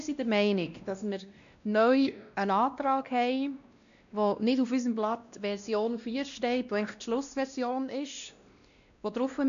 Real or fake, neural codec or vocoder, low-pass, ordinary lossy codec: fake; codec, 16 kHz, 1 kbps, X-Codec, WavLM features, trained on Multilingual LibriSpeech; 7.2 kHz; none